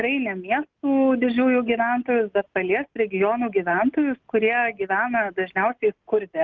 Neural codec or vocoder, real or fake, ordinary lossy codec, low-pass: none; real; Opus, 24 kbps; 7.2 kHz